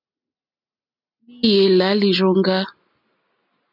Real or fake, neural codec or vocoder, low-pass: real; none; 5.4 kHz